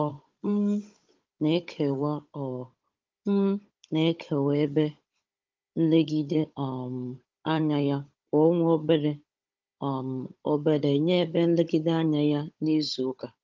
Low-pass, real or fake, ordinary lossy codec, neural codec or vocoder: 7.2 kHz; fake; Opus, 24 kbps; codec, 16 kHz, 16 kbps, FunCodec, trained on Chinese and English, 50 frames a second